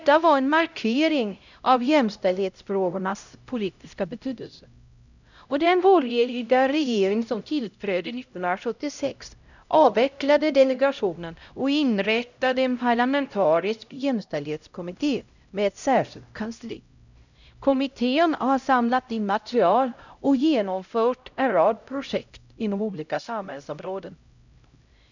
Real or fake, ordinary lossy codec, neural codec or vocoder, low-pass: fake; none; codec, 16 kHz, 0.5 kbps, X-Codec, HuBERT features, trained on LibriSpeech; 7.2 kHz